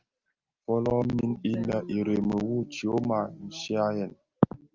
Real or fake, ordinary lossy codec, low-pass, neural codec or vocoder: real; Opus, 32 kbps; 7.2 kHz; none